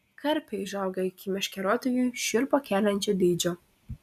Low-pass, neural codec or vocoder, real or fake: 14.4 kHz; none; real